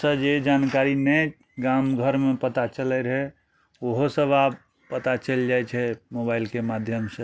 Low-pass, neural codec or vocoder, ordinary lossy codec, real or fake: none; none; none; real